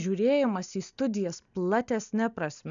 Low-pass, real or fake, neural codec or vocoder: 7.2 kHz; real; none